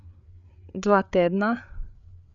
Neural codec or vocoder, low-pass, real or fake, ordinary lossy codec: codec, 16 kHz, 8 kbps, FreqCodec, larger model; 7.2 kHz; fake; none